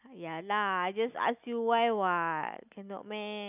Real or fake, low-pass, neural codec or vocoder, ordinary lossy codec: real; 3.6 kHz; none; none